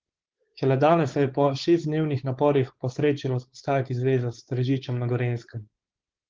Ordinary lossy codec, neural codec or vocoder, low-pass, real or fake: Opus, 16 kbps; codec, 16 kHz, 4.8 kbps, FACodec; 7.2 kHz; fake